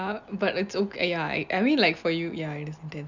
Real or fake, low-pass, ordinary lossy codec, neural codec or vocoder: real; 7.2 kHz; none; none